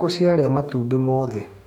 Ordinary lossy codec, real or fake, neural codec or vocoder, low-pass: none; fake; codec, 44.1 kHz, 2.6 kbps, DAC; 19.8 kHz